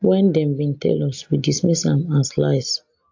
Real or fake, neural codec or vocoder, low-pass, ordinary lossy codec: real; none; 7.2 kHz; MP3, 48 kbps